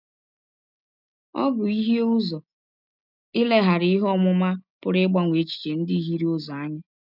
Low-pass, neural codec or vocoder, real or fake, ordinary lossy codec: 5.4 kHz; none; real; none